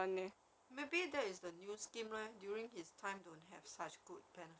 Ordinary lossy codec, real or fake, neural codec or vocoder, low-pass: none; real; none; none